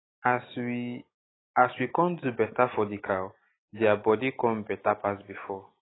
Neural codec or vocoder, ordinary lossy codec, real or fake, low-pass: none; AAC, 16 kbps; real; 7.2 kHz